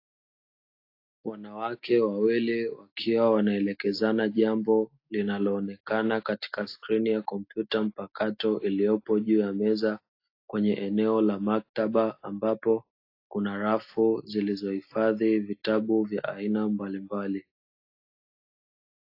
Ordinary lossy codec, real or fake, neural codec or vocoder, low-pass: AAC, 32 kbps; real; none; 5.4 kHz